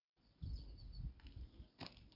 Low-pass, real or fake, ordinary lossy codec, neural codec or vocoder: 5.4 kHz; real; Opus, 64 kbps; none